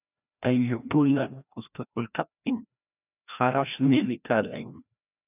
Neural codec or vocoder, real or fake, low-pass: codec, 16 kHz, 1 kbps, FreqCodec, larger model; fake; 3.6 kHz